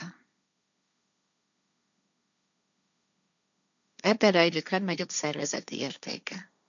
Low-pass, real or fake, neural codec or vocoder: 7.2 kHz; fake; codec, 16 kHz, 1.1 kbps, Voila-Tokenizer